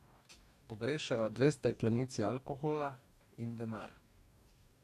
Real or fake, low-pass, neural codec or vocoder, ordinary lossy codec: fake; 14.4 kHz; codec, 44.1 kHz, 2.6 kbps, DAC; none